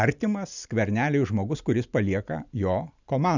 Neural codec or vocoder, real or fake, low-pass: none; real; 7.2 kHz